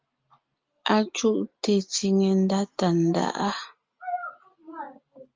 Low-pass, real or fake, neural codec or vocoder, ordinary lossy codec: 7.2 kHz; real; none; Opus, 32 kbps